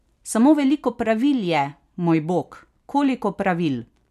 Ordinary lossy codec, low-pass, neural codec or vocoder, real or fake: none; 14.4 kHz; none; real